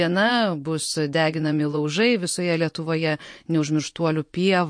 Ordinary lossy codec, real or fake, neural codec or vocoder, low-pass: MP3, 48 kbps; fake; vocoder, 24 kHz, 100 mel bands, Vocos; 9.9 kHz